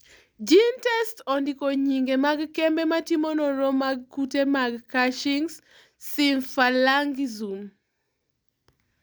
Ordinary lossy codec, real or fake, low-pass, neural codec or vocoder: none; real; none; none